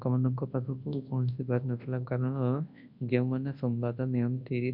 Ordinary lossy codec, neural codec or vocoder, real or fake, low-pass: MP3, 48 kbps; codec, 24 kHz, 0.9 kbps, WavTokenizer, large speech release; fake; 5.4 kHz